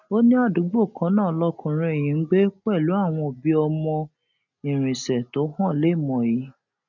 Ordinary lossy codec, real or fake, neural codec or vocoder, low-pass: none; real; none; 7.2 kHz